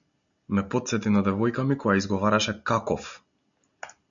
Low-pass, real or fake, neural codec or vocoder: 7.2 kHz; real; none